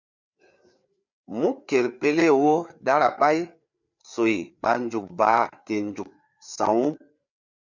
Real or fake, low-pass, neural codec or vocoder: fake; 7.2 kHz; codec, 16 kHz, 4 kbps, FreqCodec, larger model